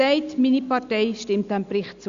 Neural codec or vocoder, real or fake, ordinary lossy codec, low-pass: none; real; Opus, 64 kbps; 7.2 kHz